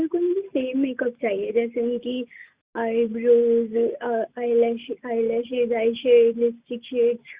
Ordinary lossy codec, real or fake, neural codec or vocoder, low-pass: Opus, 64 kbps; real; none; 3.6 kHz